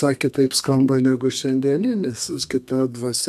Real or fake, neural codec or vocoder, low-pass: fake; codec, 32 kHz, 1.9 kbps, SNAC; 14.4 kHz